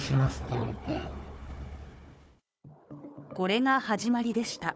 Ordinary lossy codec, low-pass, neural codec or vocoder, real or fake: none; none; codec, 16 kHz, 4 kbps, FunCodec, trained on Chinese and English, 50 frames a second; fake